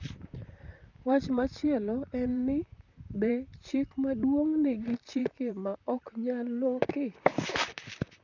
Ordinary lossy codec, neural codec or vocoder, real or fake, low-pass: none; vocoder, 44.1 kHz, 128 mel bands, Pupu-Vocoder; fake; 7.2 kHz